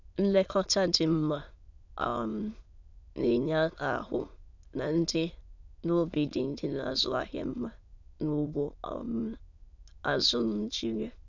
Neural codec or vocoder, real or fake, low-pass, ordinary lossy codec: autoencoder, 22.05 kHz, a latent of 192 numbers a frame, VITS, trained on many speakers; fake; 7.2 kHz; none